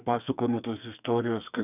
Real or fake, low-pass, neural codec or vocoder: fake; 3.6 kHz; codec, 44.1 kHz, 2.6 kbps, SNAC